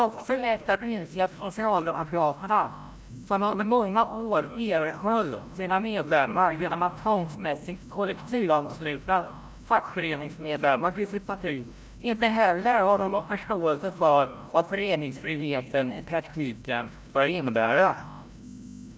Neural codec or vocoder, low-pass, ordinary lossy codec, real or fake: codec, 16 kHz, 0.5 kbps, FreqCodec, larger model; none; none; fake